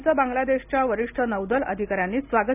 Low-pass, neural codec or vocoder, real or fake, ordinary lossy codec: 3.6 kHz; none; real; none